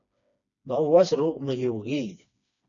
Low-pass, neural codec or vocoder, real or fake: 7.2 kHz; codec, 16 kHz, 2 kbps, FreqCodec, smaller model; fake